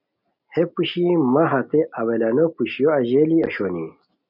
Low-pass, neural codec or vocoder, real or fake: 5.4 kHz; none; real